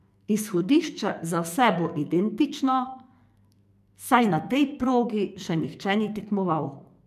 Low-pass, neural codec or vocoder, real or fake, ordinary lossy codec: 14.4 kHz; codec, 44.1 kHz, 2.6 kbps, SNAC; fake; MP3, 96 kbps